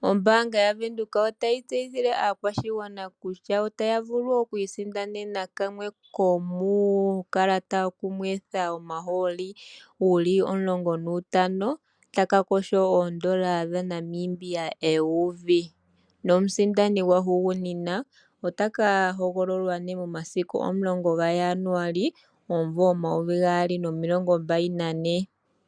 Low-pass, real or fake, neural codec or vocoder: 9.9 kHz; real; none